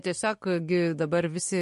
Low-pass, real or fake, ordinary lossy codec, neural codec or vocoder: 14.4 kHz; real; MP3, 48 kbps; none